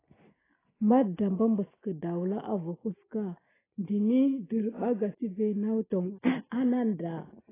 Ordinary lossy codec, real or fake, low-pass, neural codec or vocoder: AAC, 16 kbps; real; 3.6 kHz; none